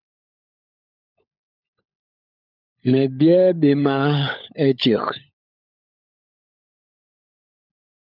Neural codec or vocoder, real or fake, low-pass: codec, 16 kHz, 16 kbps, FunCodec, trained on LibriTTS, 50 frames a second; fake; 5.4 kHz